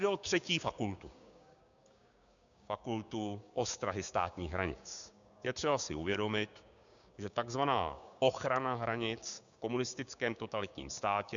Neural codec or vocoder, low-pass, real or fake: codec, 16 kHz, 6 kbps, DAC; 7.2 kHz; fake